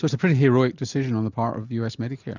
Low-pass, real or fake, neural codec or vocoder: 7.2 kHz; real; none